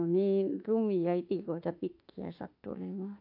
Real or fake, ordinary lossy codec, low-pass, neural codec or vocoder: fake; AAC, 32 kbps; 5.4 kHz; autoencoder, 48 kHz, 32 numbers a frame, DAC-VAE, trained on Japanese speech